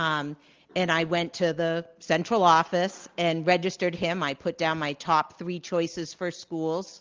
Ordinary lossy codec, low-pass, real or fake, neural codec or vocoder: Opus, 32 kbps; 7.2 kHz; real; none